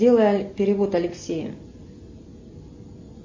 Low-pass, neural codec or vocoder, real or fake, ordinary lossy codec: 7.2 kHz; none; real; MP3, 32 kbps